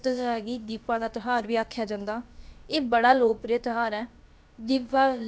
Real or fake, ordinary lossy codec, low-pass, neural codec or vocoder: fake; none; none; codec, 16 kHz, about 1 kbps, DyCAST, with the encoder's durations